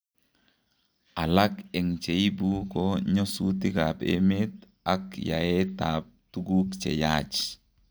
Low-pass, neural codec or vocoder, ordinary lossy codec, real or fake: none; none; none; real